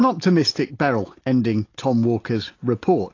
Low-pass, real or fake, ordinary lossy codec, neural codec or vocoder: 7.2 kHz; real; AAC, 32 kbps; none